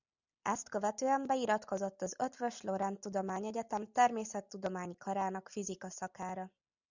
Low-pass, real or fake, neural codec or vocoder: 7.2 kHz; real; none